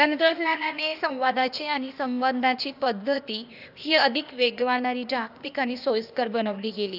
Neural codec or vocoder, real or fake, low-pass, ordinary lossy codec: codec, 16 kHz, 0.8 kbps, ZipCodec; fake; 5.4 kHz; none